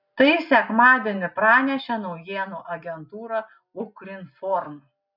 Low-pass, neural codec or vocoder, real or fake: 5.4 kHz; none; real